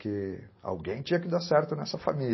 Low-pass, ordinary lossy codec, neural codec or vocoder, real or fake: 7.2 kHz; MP3, 24 kbps; none; real